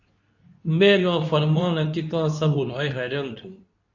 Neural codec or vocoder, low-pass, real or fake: codec, 24 kHz, 0.9 kbps, WavTokenizer, medium speech release version 2; 7.2 kHz; fake